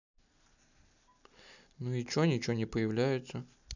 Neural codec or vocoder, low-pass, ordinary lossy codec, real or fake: none; 7.2 kHz; none; real